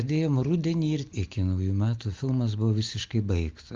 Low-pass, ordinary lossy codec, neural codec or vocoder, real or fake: 7.2 kHz; Opus, 32 kbps; none; real